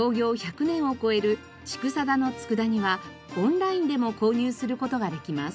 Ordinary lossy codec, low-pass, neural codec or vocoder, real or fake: none; none; none; real